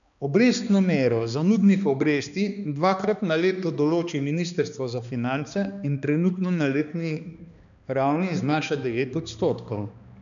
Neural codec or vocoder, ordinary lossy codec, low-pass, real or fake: codec, 16 kHz, 2 kbps, X-Codec, HuBERT features, trained on balanced general audio; none; 7.2 kHz; fake